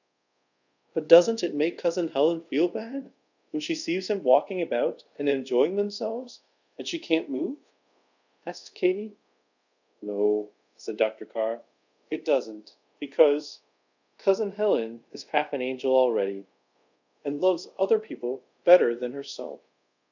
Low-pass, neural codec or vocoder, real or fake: 7.2 kHz; codec, 24 kHz, 0.5 kbps, DualCodec; fake